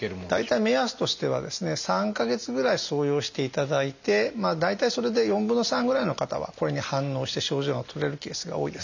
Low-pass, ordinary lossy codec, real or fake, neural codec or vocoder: 7.2 kHz; none; real; none